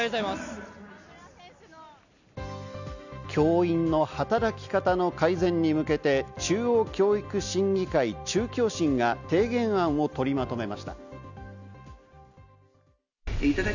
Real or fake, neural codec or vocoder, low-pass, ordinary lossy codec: real; none; 7.2 kHz; none